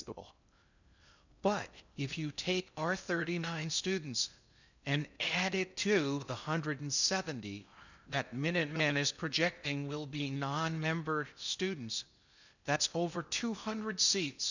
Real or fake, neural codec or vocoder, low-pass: fake; codec, 16 kHz in and 24 kHz out, 0.6 kbps, FocalCodec, streaming, 2048 codes; 7.2 kHz